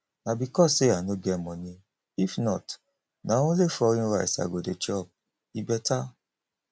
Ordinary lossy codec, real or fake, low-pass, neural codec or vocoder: none; real; none; none